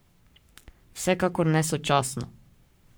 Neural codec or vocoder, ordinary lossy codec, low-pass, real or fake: codec, 44.1 kHz, 7.8 kbps, Pupu-Codec; none; none; fake